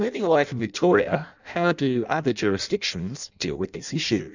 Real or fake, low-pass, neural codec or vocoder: fake; 7.2 kHz; codec, 16 kHz in and 24 kHz out, 0.6 kbps, FireRedTTS-2 codec